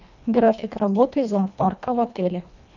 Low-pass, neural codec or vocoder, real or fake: 7.2 kHz; codec, 24 kHz, 1.5 kbps, HILCodec; fake